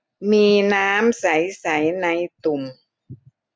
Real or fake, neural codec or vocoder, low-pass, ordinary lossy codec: real; none; none; none